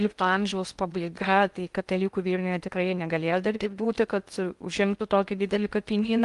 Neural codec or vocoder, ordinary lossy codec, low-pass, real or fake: codec, 16 kHz in and 24 kHz out, 0.6 kbps, FocalCodec, streaming, 2048 codes; Opus, 32 kbps; 10.8 kHz; fake